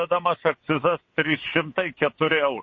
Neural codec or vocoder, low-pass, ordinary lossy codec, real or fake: vocoder, 22.05 kHz, 80 mel bands, WaveNeXt; 7.2 kHz; MP3, 32 kbps; fake